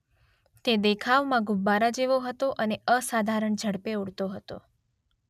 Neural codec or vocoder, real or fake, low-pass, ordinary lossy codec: none; real; 14.4 kHz; none